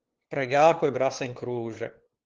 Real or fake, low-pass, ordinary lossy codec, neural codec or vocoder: fake; 7.2 kHz; Opus, 16 kbps; codec, 16 kHz, 2 kbps, FunCodec, trained on LibriTTS, 25 frames a second